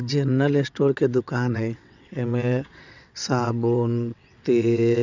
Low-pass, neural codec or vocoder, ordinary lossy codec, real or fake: 7.2 kHz; vocoder, 22.05 kHz, 80 mel bands, WaveNeXt; none; fake